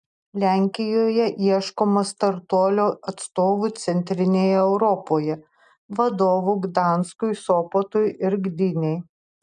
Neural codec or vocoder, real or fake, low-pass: none; real; 10.8 kHz